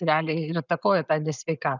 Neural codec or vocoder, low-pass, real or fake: none; 7.2 kHz; real